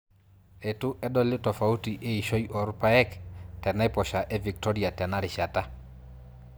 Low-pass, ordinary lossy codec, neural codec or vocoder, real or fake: none; none; none; real